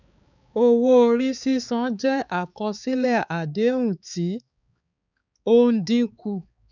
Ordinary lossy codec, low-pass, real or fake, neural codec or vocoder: none; 7.2 kHz; fake; codec, 16 kHz, 4 kbps, X-Codec, HuBERT features, trained on balanced general audio